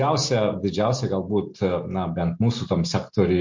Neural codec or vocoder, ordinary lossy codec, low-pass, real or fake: none; MP3, 48 kbps; 7.2 kHz; real